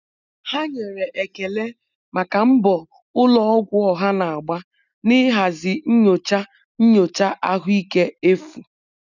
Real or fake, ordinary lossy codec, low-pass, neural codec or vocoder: real; none; 7.2 kHz; none